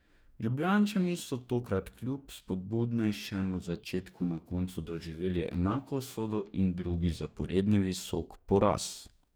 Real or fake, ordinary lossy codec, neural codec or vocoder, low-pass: fake; none; codec, 44.1 kHz, 2.6 kbps, DAC; none